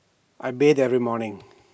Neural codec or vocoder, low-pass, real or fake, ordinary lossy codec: none; none; real; none